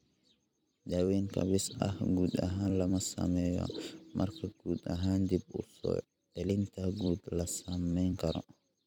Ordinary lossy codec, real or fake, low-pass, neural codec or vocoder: none; fake; 19.8 kHz; vocoder, 44.1 kHz, 128 mel bands every 256 samples, BigVGAN v2